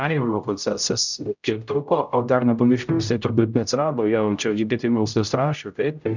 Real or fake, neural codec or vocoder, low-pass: fake; codec, 16 kHz, 0.5 kbps, X-Codec, HuBERT features, trained on general audio; 7.2 kHz